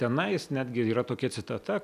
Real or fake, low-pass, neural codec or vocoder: real; 14.4 kHz; none